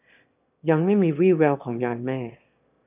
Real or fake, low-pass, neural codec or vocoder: fake; 3.6 kHz; autoencoder, 22.05 kHz, a latent of 192 numbers a frame, VITS, trained on one speaker